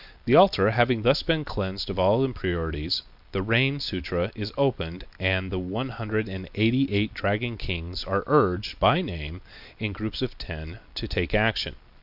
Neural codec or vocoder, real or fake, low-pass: none; real; 5.4 kHz